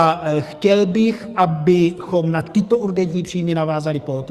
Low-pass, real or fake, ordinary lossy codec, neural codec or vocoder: 14.4 kHz; fake; Opus, 64 kbps; codec, 44.1 kHz, 2.6 kbps, SNAC